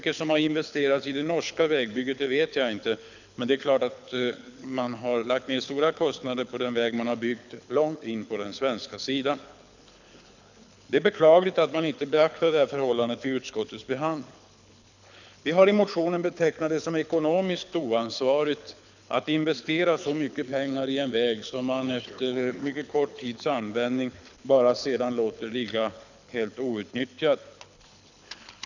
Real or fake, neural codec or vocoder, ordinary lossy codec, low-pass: fake; codec, 24 kHz, 6 kbps, HILCodec; none; 7.2 kHz